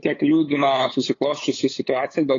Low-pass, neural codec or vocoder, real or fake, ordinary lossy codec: 7.2 kHz; codec, 16 kHz, 8 kbps, FunCodec, trained on LibriTTS, 25 frames a second; fake; AAC, 32 kbps